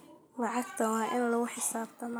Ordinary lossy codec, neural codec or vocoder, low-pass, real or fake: none; none; none; real